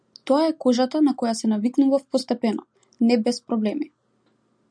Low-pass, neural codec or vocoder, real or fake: 9.9 kHz; none; real